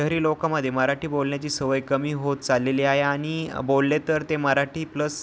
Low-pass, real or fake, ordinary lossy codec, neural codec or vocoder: none; real; none; none